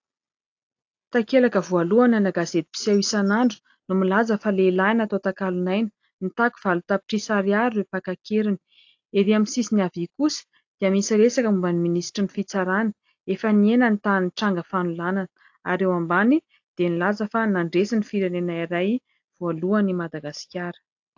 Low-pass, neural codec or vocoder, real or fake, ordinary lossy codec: 7.2 kHz; none; real; AAC, 48 kbps